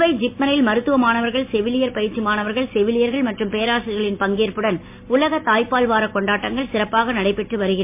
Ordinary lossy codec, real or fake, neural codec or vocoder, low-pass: MP3, 32 kbps; real; none; 3.6 kHz